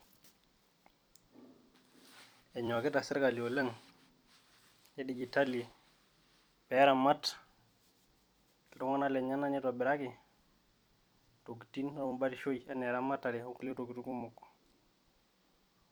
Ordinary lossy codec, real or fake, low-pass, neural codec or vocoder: none; fake; none; vocoder, 44.1 kHz, 128 mel bands every 256 samples, BigVGAN v2